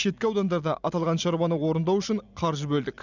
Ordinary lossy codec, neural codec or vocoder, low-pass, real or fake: none; none; 7.2 kHz; real